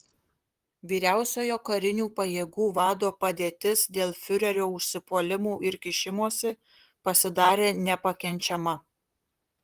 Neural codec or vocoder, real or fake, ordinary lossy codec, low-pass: vocoder, 44.1 kHz, 128 mel bands, Pupu-Vocoder; fake; Opus, 24 kbps; 14.4 kHz